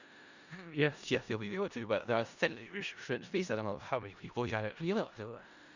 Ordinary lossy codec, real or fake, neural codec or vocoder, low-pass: Opus, 64 kbps; fake; codec, 16 kHz in and 24 kHz out, 0.4 kbps, LongCat-Audio-Codec, four codebook decoder; 7.2 kHz